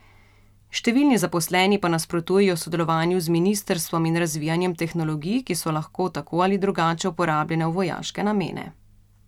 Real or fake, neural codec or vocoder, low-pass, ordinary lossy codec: real; none; 19.8 kHz; none